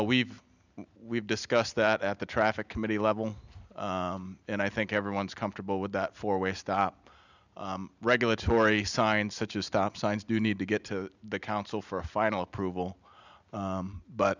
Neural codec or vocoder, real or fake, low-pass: none; real; 7.2 kHz